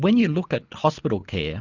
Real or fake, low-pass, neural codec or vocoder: real; 7.2 kHz; none